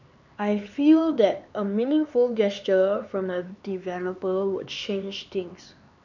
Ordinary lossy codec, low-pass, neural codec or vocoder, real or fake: none; 7.2 kHz; codec, 16 kHz, 4 kbps, X-Codec, HuBERT features, trained on LibriSpeech; fake